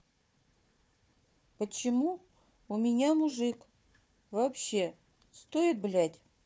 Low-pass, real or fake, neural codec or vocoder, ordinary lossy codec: none; fake; codec, 16 kHz, 4 kbps, FunCodec, trained on Chinese and English, 50 frames a second; none